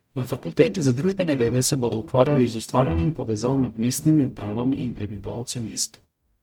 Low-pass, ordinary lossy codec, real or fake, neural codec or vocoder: 19.8 kHz; MP3, 96 kbps; fake; codec, 44.1 kHz, 0.9 kbps, DAC